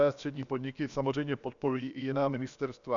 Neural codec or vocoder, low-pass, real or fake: codec, 16 kHz, about 1 kbps, DyCAST, with the encoder's durations; 7.2 kHz; fake